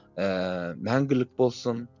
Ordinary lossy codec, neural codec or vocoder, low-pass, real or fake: none; none; 7.2 kHz; real